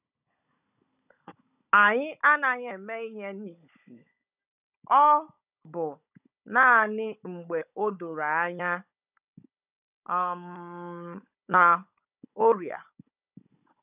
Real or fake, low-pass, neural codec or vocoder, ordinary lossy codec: fake; 3.6 kHz; codec, 16 kHz, 16 kbps, FunCodec, trained on Chinese and English, 50 frames a second; none